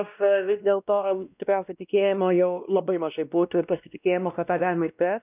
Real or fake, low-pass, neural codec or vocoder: fake; 3.6 kHz; codec, 16 kHz, 1 kbps, X-Codec, WavLM features, trained on Multilingual LibriSpeech